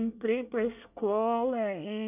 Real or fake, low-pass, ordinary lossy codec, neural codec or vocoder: fake; 3.6 kHz; none; codec, 24 kHz, 1 kbps, SNAC